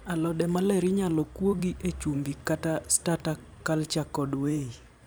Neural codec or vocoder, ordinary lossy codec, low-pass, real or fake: vocoder, 44.1 kHz, 128 mel bands every 512 samples, BigVGAN v2; none; none; fake